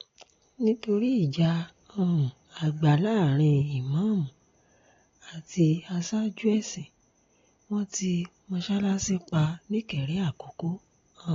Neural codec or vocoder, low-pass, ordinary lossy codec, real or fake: none; 7.2 kHz; AAC, 32 kbps; real